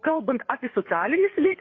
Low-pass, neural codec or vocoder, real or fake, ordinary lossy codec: 7.2 kHz; codec, 16 kHz, 4 kbps, FreqCodec, larger model; fake; AAC, 32 kbps